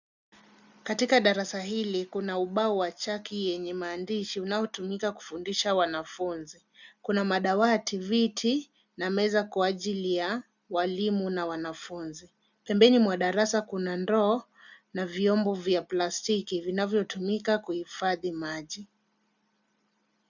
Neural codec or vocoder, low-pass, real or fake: none; 7.2 kHz; real